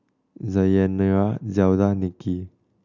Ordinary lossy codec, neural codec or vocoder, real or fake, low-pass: none; none; real; 7.2 kHz